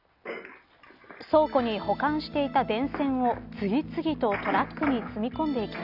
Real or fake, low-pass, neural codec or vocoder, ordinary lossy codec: real; 5.4 kHz; none; none